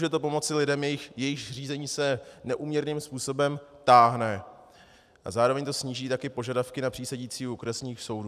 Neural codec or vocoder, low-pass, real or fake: none; 14.4 kHz; real